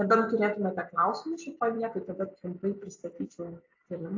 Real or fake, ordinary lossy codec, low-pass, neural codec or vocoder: real; AAC, 48 kbps; 7.2 kHz; none